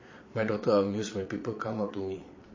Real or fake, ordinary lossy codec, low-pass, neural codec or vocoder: fake; MP3, 32 kbps; 7.2 kHz; vocoder, 22.05 kHz, 80 mel bands, Vocos